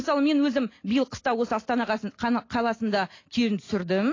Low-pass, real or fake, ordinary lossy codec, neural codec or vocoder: 7.2 kHz; real; AAC, 32 kbps; none